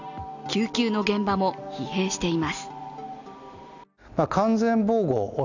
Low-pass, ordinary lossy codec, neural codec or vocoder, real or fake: 7.2 kHz; none; none; real